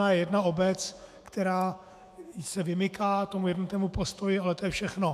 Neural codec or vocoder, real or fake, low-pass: autoencoder, 48 kHz, 128 numbers a frame, DAC-VAE, trained on Japanese speech; fake; 14.4 kHz